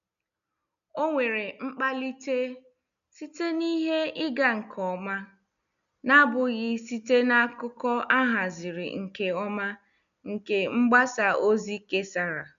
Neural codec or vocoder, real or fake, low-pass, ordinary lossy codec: none; real; 7.2 kHz; none